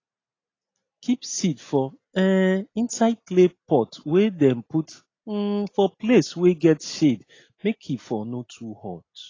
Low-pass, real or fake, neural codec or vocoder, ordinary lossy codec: 7.2 kHz; real; none; AAC, 32 kbps